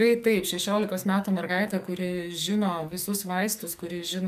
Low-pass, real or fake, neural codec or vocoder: 14.4 kHz; fake; codec, 44.1 kHz, 2.6 kbps, SNAC